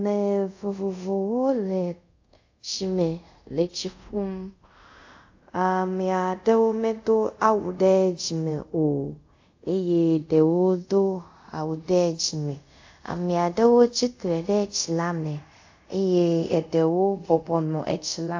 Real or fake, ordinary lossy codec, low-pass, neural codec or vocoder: fake; AAC, 48 kbps; 7.2 kHz; codec, 24 kHz, 0.5 kbps, DualCodec